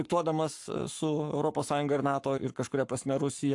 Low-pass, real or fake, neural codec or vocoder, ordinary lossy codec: 10.8 kHz; fake; codec, 44.1 kHz, 7.8 kbps, Pupu-Codec; AAC, 64 kbps